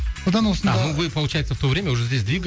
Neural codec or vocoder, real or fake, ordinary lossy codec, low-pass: none; real; none; none